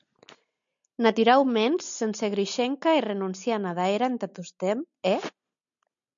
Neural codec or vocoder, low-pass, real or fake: none; 7.2 kHz; real